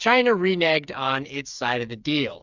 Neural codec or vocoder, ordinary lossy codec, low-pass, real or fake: codec, 16 kHz, 4 kbps, FreqCodec, smaller model; Opus, 64 kbps; 7.2 kHz; fake